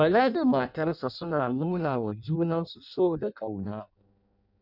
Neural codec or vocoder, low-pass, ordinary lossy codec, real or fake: codec, 16 kHz in and 24 kHz out, 0.6 kbps, FireRedTTS-2 codec; 5.4 kHz; none; fake